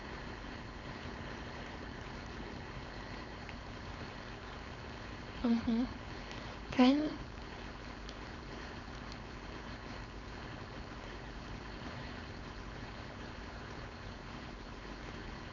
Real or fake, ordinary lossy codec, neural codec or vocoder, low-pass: fake; none; codec, 16 kHz, 4.8 kbps, FACodec; 7.2 kHz